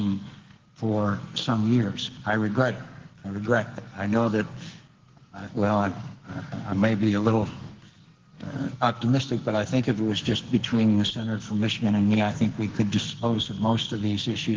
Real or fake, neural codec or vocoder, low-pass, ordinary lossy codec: fake; codec, 44.1 kHz, 2.6 kbps, SNAC; 7.2 kHz; Opus, 16 kbps